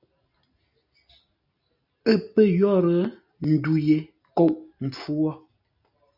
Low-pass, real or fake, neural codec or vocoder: 5.4 kHz; real; none